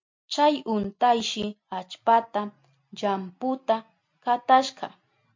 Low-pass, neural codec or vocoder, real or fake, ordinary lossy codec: 7.2 kHz; none; real; MP3, 48 kbps